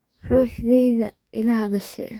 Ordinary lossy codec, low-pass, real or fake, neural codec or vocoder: none; 19.8 kHz; fake; codec, 44.1 kHz, 2.6 kbps, DAC